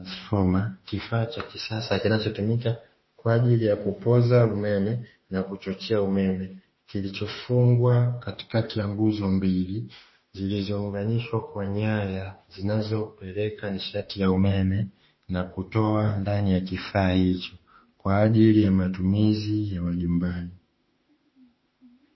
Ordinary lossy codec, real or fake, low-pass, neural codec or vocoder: MP3, 24 kbps; fake; 7.2 kHz; autoencoder, 48 kHz, 32 numbers a frame, DAC-VAE, trained on Japanese speech